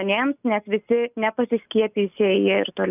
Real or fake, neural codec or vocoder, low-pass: real; none; 3.6 kHz